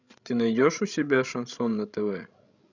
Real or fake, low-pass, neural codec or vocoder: fake; 7.2 kHz; codec, 16 kHz, 16 kbps, FreqCodec, larger model